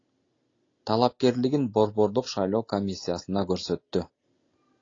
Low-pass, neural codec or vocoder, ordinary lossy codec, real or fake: 7.2 kHz; none; AAC, 32 kbps; real